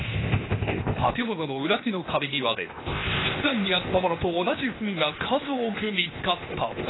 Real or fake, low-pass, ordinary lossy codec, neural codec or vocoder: fake; 7.2 kHz; AAC, 16 kbps; codec, 16 kHz, 0.8 kbps, ZipCodec